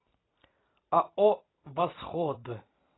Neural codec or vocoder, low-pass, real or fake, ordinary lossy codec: vocoder, 44.1 kHz, 128 mel bands every 256 samples, BigVGAN v2; 7.2 kHz; fake; AAC, 16 kbps